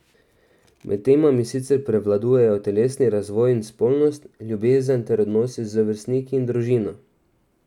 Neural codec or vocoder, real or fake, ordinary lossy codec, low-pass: none; real; none; 19.8 kHz